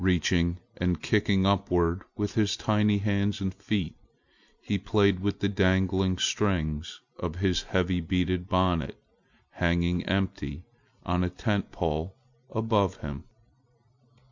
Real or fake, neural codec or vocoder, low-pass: real; none; 7.2 kHz